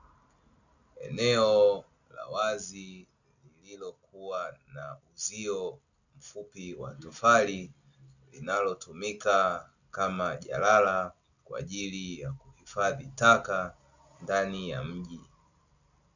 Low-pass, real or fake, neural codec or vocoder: 7.2 kHz; real; none